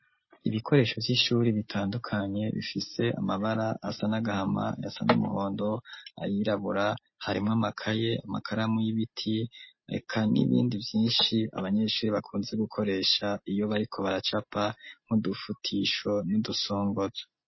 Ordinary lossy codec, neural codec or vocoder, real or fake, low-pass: MP3, 24 kbps; none; real; 7.2 kHz